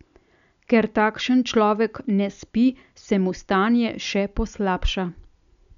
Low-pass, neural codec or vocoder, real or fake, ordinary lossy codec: 7.2 kHz; none; real; none